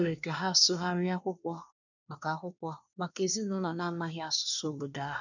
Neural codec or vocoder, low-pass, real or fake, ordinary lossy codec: codec, 24 kHz, 1 kbps, SNAC; 7.2 kHz; fake; none